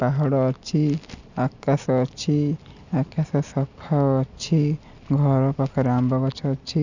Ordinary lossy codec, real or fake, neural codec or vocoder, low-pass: none; real; none; 7.2 kHz